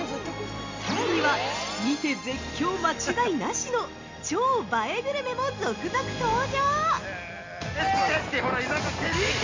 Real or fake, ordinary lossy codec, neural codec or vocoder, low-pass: real; MP3, 48 kbps; none; 7.2 kHz